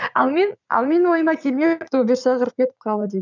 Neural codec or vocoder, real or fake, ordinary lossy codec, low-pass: vocoder, 44.1 kHz, 80 mel bands, Vocos; fake; none; 7.2 kHz